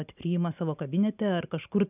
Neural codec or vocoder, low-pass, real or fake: none; 3.6 kHz; real